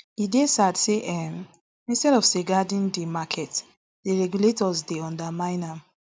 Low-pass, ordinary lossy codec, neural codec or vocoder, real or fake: none; none; none; real